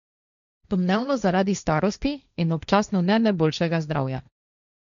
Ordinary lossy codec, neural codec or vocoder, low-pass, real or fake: none; codec, 16 kHz, 1.1 kbps, Voila-Tokenizer; 7.2 kHz; fake